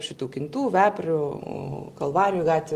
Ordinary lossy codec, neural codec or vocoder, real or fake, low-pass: Opus, 24 kbps; none; real; 14.4 kHz